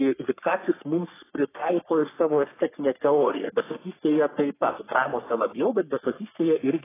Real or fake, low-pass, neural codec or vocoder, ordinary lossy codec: fake; 3.6 kHz; codec, 44.1 kHz, 3.4 kbps, Pupu-Codec; AAC, 16 kbps